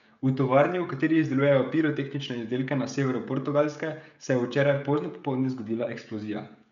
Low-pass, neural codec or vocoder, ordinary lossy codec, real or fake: 7.2 kHz; codec, 16 kHz, 16 kbps, FreqCodec, smaller model; none; fake